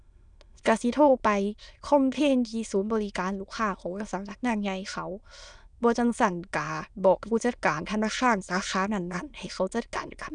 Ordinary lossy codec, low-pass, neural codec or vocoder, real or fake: none; 9.9 kHz; autoencoder, 22.05 kHz, a latent of 192 numbers a frame, VITS, trained on many speakers; fake